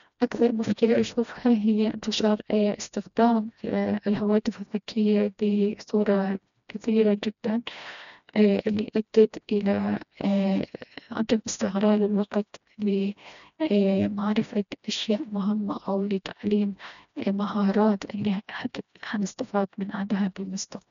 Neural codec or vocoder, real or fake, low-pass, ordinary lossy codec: codec, 16 kHz, 1 kbps, FreqCodec, smaller model; fake; 7.2 kHz; none